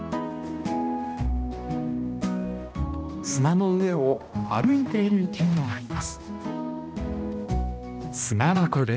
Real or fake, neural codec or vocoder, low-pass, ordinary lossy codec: fake; codec, 16 kHz, 1 kbps, X-Codec, HuBERT features, trained on balanced general audio; none; none